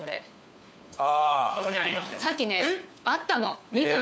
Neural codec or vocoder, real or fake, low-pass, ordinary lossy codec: codec, 16 kHz, 4 kbps, FunCodec, trained on LibriTTS, 50 frames a second; fake; none; none